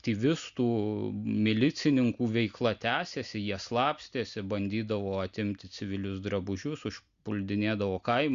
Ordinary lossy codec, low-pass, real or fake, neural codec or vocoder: Opus, 64 kbps; 7.2 kHz; real; none